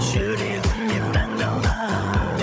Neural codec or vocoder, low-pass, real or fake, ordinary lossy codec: codec, 16 kHz, 16 kbps, FunCodec, trained on Chinese and English, 50 frames a second; none; fake; none